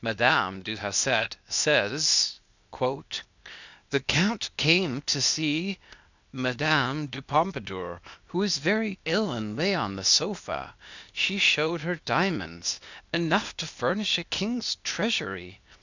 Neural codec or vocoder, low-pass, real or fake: codec, 16 kHz, 0.8 kbps, ZipCodec; 7.2 kHz; fake